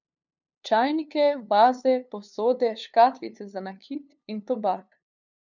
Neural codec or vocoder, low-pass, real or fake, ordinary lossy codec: codec, 16 kHz, 2 kbps, FunCodec, trained on LibriTTS, 25 frames a second; 7.2 kHz; fake; none